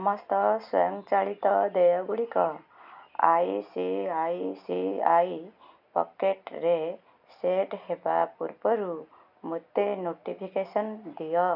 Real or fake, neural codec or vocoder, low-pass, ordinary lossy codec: real; none; 5.4 kHz; none